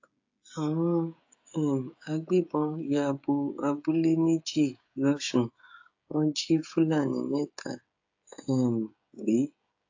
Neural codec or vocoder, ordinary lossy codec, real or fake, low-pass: codec, 16 kHz, 16 kbps, FreqCodec, smaller model; AAC, 48 kbps; fake; 7.2 kHz